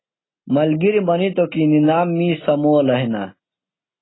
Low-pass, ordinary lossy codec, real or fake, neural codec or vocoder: 7.2 kHz; AAC, 16 kbps; real; none